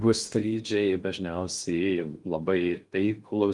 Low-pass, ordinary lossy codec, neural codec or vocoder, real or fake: 10.8 kHz; Opus, 32 kbps; codec, 16 kHz in and 24 kHz out, 0.6 kbps, FocalCodec, streaming, 2048 codes; fake